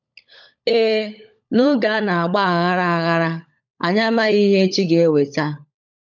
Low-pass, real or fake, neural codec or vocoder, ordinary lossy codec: 7.2 kHz; fake; codec, 16 kHz, 16 kbps, FunCodec, trained on LibriTTS, 50 frames a second; none